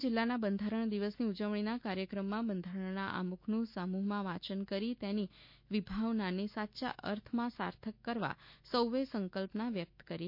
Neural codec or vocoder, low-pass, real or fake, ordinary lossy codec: autoencoder, 48 kHz, 128 numbers a frame, DAC-VAE, trained on Japanese speech; 5.4 kHz; fake; MP3, 32 kbps